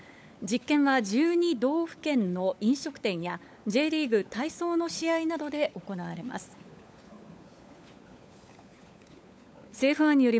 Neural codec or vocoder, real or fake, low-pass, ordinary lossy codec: codec, 16 kHz, 16 kbps, FunCodec, trained on LibriTTS, 50 frames a second; fake; none; none